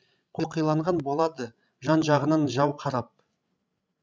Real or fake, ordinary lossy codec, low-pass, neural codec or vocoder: fake; none; none; codec, 16 kHz, 16 kbps, FreqCodec, larger model